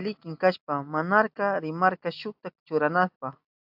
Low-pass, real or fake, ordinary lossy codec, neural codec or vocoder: 5.4 kHz; real; AAC, 48 kbps; none